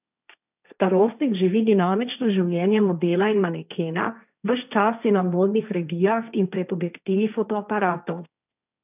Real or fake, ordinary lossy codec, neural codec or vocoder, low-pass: fake; none; codec, 16 kHz, 1.1 kbps, Voila-Tokenizer; 3.6 kHz